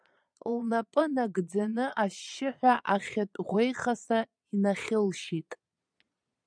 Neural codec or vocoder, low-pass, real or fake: vocoder, 22.05 kHz, 80 mel bands, Vocos; 9.9 kHz; fake